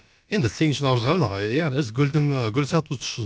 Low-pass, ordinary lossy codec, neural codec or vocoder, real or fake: none; none; codec, 16 kHz, about 1 kbps, DyCAST, with the encoder's durations; fake